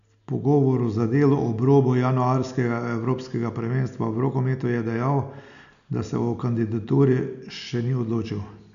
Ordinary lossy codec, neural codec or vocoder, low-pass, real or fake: none; none; 7.2 kHz; real